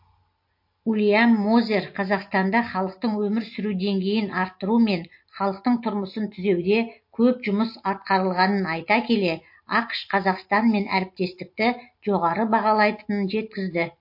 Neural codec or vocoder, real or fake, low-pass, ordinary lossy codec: none; real; 5.4 kHz; MP3, 32 kbps